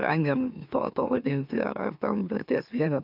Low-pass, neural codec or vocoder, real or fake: 5.4 kHz; autoencoder, 44.1 kHz, a latent of 192 numbers a frame, MeloTTS; fake